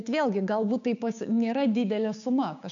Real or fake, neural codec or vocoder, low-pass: fake; codec, 16 kHz, 6 kbps, DAC; 7.2 kHz